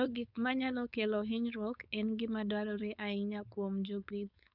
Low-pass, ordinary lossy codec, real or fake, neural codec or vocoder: 5.4 kHz; none; fake; codec, 16 kHz, 4.8 kbps, FACodec